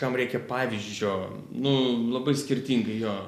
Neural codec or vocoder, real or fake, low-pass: none; real; 14.4 kHz